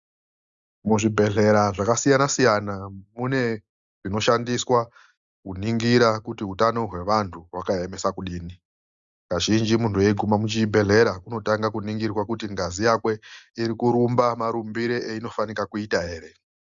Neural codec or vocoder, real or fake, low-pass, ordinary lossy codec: none; real; 7.2 kHz; Opus, 64 kbps